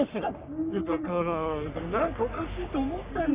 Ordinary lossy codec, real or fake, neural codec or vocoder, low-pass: Opus, 64 kbps; fake; codec, 44.1 kHz, 3.4 kbps, Pupu-Codec; 3.6 kHz